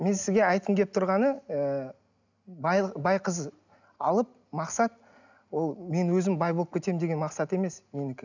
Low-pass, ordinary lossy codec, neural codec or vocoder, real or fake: 7.2 kHz; none; none; real